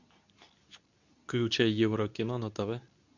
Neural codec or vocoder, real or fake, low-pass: codec, 24 kHz, 0.9 kbps, WavTokenizer, medium speech release version 2; fake; 7.2 kHz